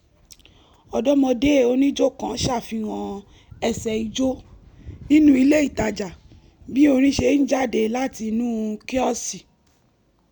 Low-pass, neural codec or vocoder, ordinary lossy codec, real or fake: 19.8 kHz; none; none; real